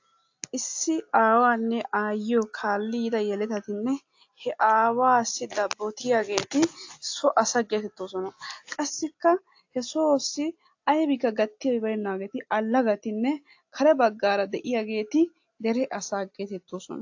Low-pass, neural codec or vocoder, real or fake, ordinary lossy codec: 7.2 kHz; none; real; AAC, 48 kbps